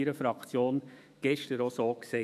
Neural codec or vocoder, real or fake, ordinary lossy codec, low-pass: autoencoder, 48 kHz, 128 numbers a frame, DAC-VAE, trained on Japanese speech; fake; none; 14.4 kHz